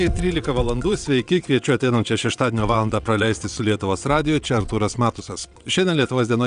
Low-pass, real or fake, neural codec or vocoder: 9.9 kHz; fake; vocoder, 22.05 kHz, 80 mel bands, WaveNeXt